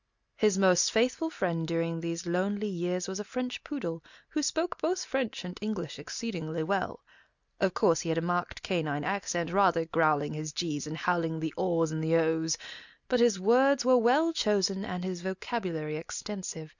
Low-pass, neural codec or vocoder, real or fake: 7.2 kHz; none; real